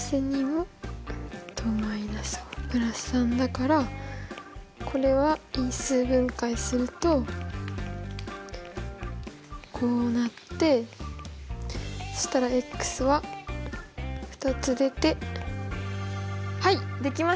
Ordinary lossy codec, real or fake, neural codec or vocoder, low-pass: none; real; none; none